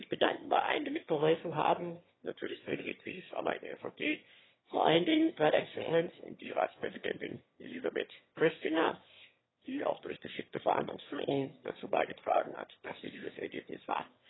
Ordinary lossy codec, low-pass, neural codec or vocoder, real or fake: AAC, 16 kbps; 7.2 kHz; autoencoder, 22.05 kHz, a latent of 192 numbers a frame, VITS, trained on one speaker; fake